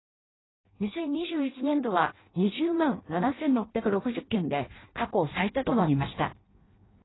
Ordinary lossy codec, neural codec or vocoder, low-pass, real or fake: AAC, 16 kbps; codec, 16 kHz in and 24 kHz out, 0.6 kbps, FireRedTTS-2 codec; 7.2 kHz; fake